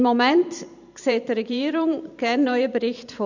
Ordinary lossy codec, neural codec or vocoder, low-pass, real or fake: none; vocoder, 44.1 kHz, 128 mel bands every 512 samples, BigVGAN v2; 7.2 kHz; fake